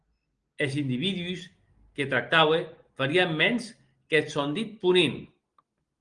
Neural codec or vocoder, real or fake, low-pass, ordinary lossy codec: none; real; 9.9 kHz; Opus, 24 kbps